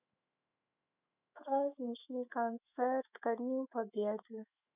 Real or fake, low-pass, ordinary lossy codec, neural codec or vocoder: fake; 3.6 kHz; none; autoencoder, 48 kHz, 128 numbers a frame, DAC-VAE, trained on Japanese speech